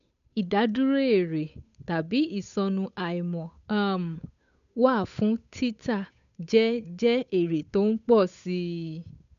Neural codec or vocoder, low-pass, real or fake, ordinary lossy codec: codec, 16 kHz, 8 kbps, FunCodec, trained on Chinese and English, 25 frames a second; 7.2 kHz; fake; none